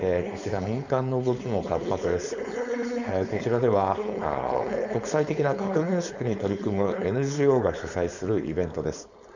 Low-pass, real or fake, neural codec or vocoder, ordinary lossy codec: 7.2 kHz; fake; codec, 16 kHz, 4.8 kbps, FACodec; none